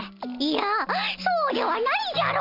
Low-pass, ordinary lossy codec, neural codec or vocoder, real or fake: 5.4 kHz; none; none; real